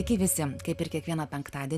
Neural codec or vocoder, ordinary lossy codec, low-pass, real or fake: none; Opus, 64 kbps; 14.4 kHz; real